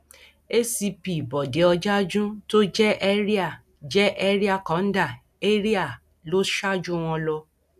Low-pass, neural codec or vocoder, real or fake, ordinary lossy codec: 14.4 kHz; none; real; none